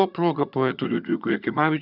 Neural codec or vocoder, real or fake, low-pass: vocoder, 22.05 kHz, 80 mel bands, HiFi-GAN; fake; 5.4 kHz